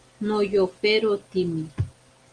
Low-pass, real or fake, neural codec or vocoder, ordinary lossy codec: 9.9 kHz; real; none; Opus, 24 kbps